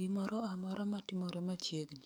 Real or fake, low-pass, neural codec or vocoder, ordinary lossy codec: fake; none; codec, 44.1 kHz, 7.8 kbps, DAC; none